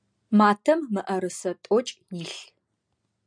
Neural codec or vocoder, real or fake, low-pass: none; real; 9.9 kHz